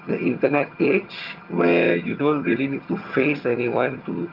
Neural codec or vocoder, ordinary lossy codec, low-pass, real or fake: vocoder, 22.05 kHz, 80 mel bands, HiFi-GAN; Opus, 24 kbps; 5.4 kHz; fake